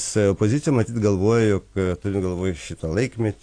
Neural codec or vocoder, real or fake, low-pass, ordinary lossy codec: none; real; 9.9 kHz; AAC, 48 kbps